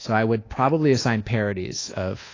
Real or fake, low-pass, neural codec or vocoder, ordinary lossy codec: fake; 7.2 kHz; codec, 24 kHz, 1.2 kbps, DualCodec; AAC, 32 kbps